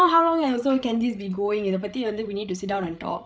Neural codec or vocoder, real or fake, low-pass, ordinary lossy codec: codec, 16 kHz, 8 kbps, FreqCodec, larger model; fake; none; none